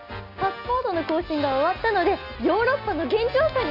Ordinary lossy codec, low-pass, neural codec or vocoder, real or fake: none; 5.4 kHz; none; real